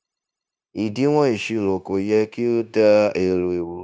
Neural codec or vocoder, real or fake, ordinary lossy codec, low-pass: codec, 16 kHz, 0.9 kbps, LongCat-Audio-Codec; fake; none; none